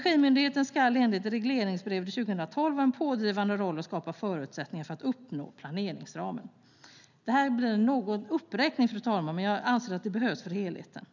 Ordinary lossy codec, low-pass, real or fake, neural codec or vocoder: none; 7.2 kHz; real; none